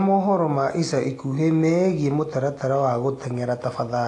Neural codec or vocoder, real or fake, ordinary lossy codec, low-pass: none; real; AAC, 48 kbps; 10.8 kHz